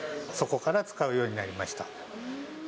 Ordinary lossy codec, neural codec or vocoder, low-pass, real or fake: none; none; none; real